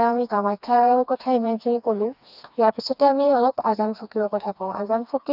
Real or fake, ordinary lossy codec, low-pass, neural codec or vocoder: fake; none; 5.4 kHz; codec, 16 kHz, 2 kbps, FreqCodec, smaller model